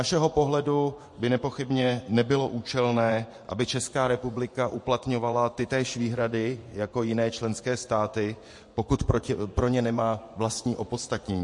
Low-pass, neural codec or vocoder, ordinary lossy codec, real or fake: 10.8 kHz; vocoder, 24 kHz, 100 mel bands, Vocos; MP3, 48 kbps; fake